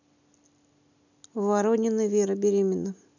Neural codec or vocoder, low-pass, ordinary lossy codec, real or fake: none; 7.2 kHz; none; real